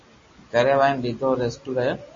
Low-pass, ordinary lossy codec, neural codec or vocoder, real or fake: 7.2 kHz; MP3, 32 kbps; none; real